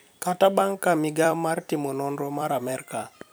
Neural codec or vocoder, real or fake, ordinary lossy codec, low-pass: vocoder, 44.1 kHz, 128 mel bands every 256 samples, BigVGAN v2; fake; none; none